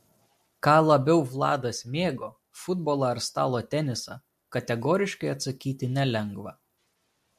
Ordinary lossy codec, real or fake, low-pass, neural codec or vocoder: MP3, 64 kbps; real; 14.4 kHz; none